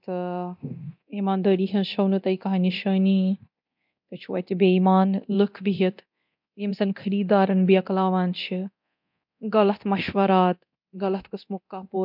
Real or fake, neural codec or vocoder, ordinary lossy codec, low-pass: fake; codec, 24 kHz, 0.9 kbps, DualCodec; MP3, 48 kbps; 5.4 kHz